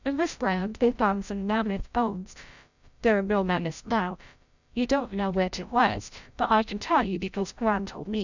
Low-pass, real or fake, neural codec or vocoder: 7.2 kHz; fake; codec, 16 kHz, 0.5 kbps, FreqCodec, larger model